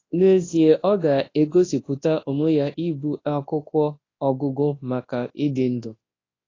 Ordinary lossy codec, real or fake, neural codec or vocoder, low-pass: AAC, 32 kbps; fake; codec, 24 kHz, 0.9 kbps, WavTokenizer, large speech release; 7.2 kHz